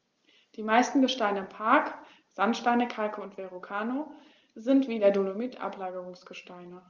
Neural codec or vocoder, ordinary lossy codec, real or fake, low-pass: none; Opus, 32 kbps; real; 7.2 kHz